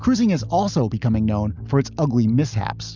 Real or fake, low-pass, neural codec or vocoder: real; 7.2 kHz; none